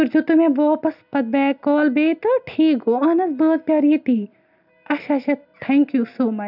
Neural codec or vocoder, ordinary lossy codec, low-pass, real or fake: none; none; 5.4 kHz; real